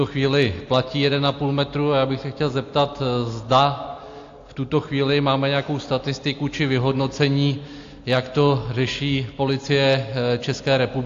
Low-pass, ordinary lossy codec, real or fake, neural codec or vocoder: 7.2 kHz; AAC, 48 kbps; real; none